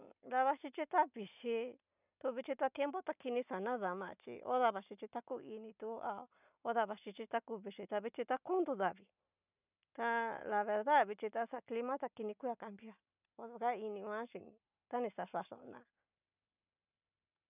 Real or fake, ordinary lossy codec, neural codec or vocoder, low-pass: real; none; none; 3.6 kHz